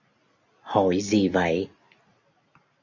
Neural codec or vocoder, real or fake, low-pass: none; real; 7.2 kHz